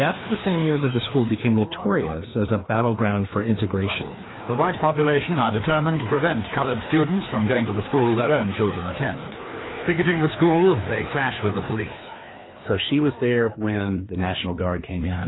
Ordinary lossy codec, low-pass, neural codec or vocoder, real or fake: AAC, 16 kbps; 7.2 kHz; codec, 16 kHz, 2 kbps, FreqCodec, larger model; fake